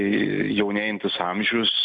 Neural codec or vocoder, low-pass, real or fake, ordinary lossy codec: none; 10.8 kHz; real; MP3, 96 kbps